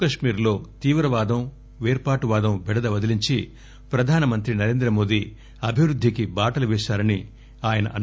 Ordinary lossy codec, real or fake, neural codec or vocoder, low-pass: none; real; none; none